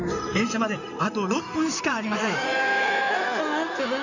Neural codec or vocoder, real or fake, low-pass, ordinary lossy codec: codec, 16 kHz in and 24 kHz out, 2.2 kbps, FireRedTTS-2 codec; fake; 7.2 kHz; none